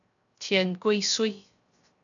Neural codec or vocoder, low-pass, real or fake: codec, 16 kHz, 0.3 kbps, FocalCodec; 7.2 kHz; fake